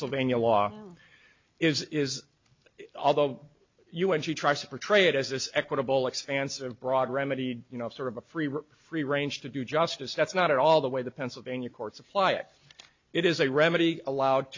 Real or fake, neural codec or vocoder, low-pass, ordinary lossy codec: real; none; 7.2 kHz; MP3, 64 kbps